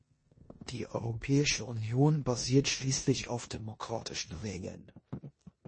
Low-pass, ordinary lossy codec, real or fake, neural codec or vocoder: 9.9 kHz; MP3, 32 kbps; fake; codec, 16 kHz in and 24 kHz out, 0.9 kbps, LongCat-Audio-Codec, four codebook decoder